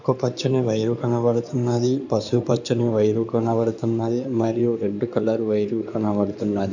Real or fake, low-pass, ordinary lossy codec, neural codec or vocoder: fake; 7.2 kHz; none; codec, 16 kHz in and 24 kHz out, 2.2 kbps, FireRedTTS-2 codec